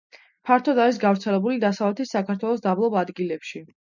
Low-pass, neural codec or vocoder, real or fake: 7.2 kHz; none; real